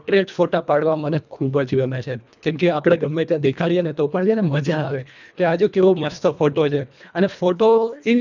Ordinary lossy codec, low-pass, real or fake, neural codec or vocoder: none; 7.2 kHz; fake; codec, 24 kHz, 1.5 kbps, HILCodec